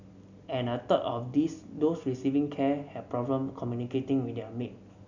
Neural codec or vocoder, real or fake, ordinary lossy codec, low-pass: none; real; none; 7.2 kHz